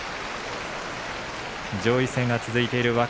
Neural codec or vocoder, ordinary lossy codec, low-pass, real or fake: none; none; none; real